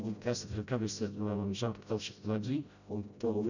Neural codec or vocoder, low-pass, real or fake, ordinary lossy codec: codec, 16 kHz, 0.5 kbps, FreqCodec, smaller model; 7.2 kHz; fake; none